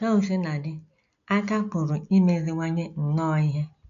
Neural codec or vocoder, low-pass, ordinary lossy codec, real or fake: none; 7.2 kHz; none; real